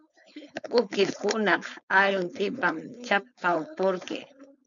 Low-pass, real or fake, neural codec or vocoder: 7.2 kHz; fake; codec, 16 kHz, 4.8 kbps, FACodec